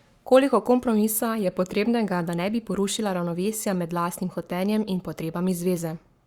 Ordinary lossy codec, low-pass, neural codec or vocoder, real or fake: Opus, 64 kbps; 19.8 kHz; codec, 44.1 kHz, 7.8 kbps, Pupu-Codec; fake